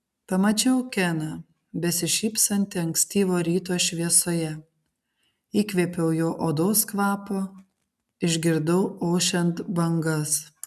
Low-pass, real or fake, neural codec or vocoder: 14.4 kHz; real; none